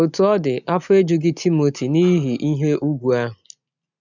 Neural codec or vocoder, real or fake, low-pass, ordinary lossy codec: none; real; 7.2 kHz; none